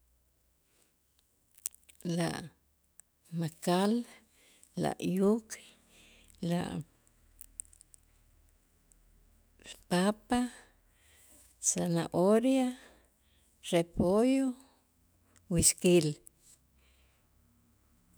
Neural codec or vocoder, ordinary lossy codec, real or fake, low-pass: autoencoder, 48 kHz, 128 numbers a frame, DAC-VAE, trained on Japanese speech; none; fake; none